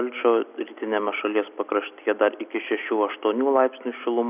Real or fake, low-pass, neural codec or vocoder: real; 3.6 kHz; none